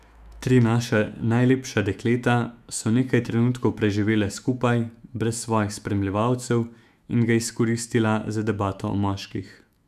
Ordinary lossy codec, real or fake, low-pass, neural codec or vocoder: none; fake; 14.4 kHz; autoencoder, 48 kHz, 128 numbers a frame, DAC-VAE, trained on Japanese speech